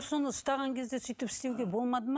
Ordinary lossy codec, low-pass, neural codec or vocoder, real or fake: none; none; none; real